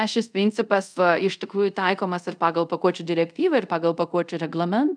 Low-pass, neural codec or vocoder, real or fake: 9.9 kHz; codec, 24 kHz, 0.5 kbps, DualCodec; fake